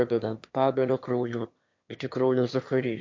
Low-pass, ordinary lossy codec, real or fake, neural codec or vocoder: 7.2 kHz; MP3, 48 kbps; fake; autoencoder, 22.05 kHz, a latent of 192 numbers a frame, VITS, trained on one speaker